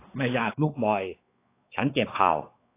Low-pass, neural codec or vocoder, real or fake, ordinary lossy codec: 3.6 kHz; codec, 16 kHz, 4 kbps, X-Codec, WavLM features, trained on Multilingual LibriSpeech; fake; AAC, 24 kbps